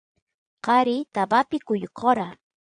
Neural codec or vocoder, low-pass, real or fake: vocoder, 22.05 kHz, 80 mel bands, Vocos; 9.9 kHz; fake